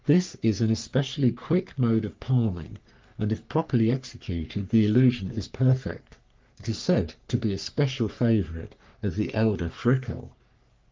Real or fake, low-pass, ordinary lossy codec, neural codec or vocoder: fake; 7.2 kHz; Opus, 32 kbps; codec, 44.1 kHz, 3.4 kbps, Pupu-Codec